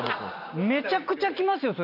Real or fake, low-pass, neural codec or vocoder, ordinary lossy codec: fake; 5.4 kHz; vocoder, 44.1 kHz, 128 mel bands every 256 samples, BigVGAN v2; none